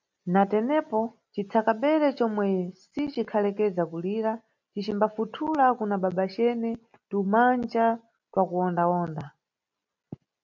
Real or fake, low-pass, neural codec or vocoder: real; 7.2 kHz; none